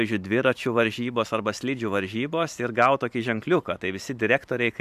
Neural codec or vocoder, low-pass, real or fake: vocoder, 44.1 kHz, 128 mel bands every 512 samples, BigVGAN v2; 14.4 kHz; fake